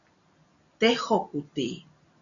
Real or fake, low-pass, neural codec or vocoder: real; 7.2 kHz; none